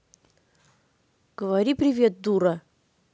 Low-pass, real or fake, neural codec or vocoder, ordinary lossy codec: none; real; none; none